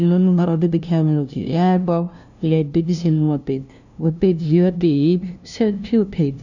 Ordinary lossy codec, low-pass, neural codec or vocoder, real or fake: none; 7.2 kHz; codec, 16 kHz, 0.5 kbps, FunCodec, trained on LibriTTS, 25 frames a second; fake